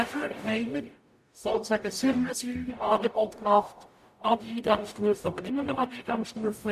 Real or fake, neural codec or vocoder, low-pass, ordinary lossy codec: fake; codec, 44.1 kHz, 0.9 kbps, DAC; 14.4 kHz; AAC, 96 kbps